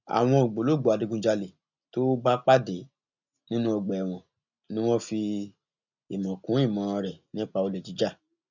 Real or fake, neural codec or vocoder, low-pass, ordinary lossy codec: real; none; 7.2 kHz; none